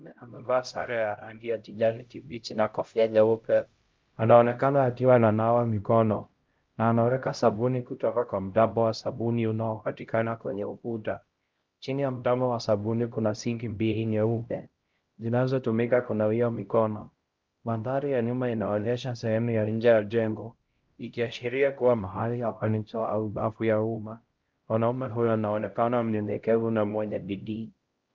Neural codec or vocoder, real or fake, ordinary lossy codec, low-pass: codec, 16 kHz, 0.5 kbps, X-Codec, HuBERT features, trained on LibriSpeech; fake; Opus, 32 kbps; 7.2 kHz